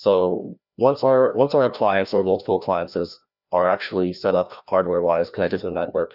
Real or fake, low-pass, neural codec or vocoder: fake; 5.4 kHz; codec, 16 kHz, 1 kbps, FreqCodec, larger model